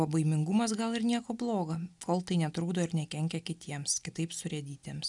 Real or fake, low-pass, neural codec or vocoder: real; 10.8 kHz; none